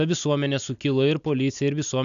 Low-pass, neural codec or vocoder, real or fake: 7.2 kHz; none; real